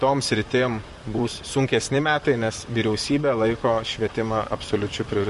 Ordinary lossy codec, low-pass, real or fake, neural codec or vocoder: MP3, 48 kbps; 14.4 kHz; fake; vocoder, 44.1 kHz, 128 mel bands, Pupu-Vocoder